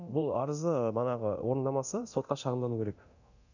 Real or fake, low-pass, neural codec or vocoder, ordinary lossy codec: fake; 7.2 kHz; codec, 24 kHz, 0.9 kbps, DualCodec; none